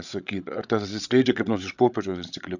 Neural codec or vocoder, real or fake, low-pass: codec, 16 kHz, 16 kbps, FreqCodec, larger model; fake; 7.2 kHz